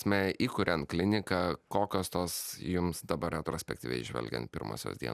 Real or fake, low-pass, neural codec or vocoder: real; 14.4 kHz; none